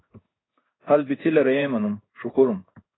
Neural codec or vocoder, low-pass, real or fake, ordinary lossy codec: codec, 16 kHz in and 24 kHz out, 1 kbps, XY-Tokenizer; 7.2 kHz; fake; AAC, 16 kbps